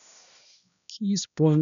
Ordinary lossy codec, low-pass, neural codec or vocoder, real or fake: none; 7.2 kHz; codec, 16 kHz, 1 kbps, X-Codec, HuBERT features, trained on balanced general audio; fake